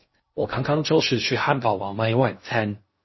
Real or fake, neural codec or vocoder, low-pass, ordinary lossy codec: fake; codec, 16 kHz in and 24 kHz out, 0.6 kbps, FocalCodec, streaming, 2048 codes; 7.2 kHz; MP3, 24 kbps